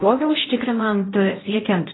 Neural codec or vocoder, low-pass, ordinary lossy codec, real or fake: codec, 16 kHz in and 24 kHz out, 0.6 kbps, FocalCodec, streaming, 4096 codes; 7.2 kHz; AAC, 16 kbps; fake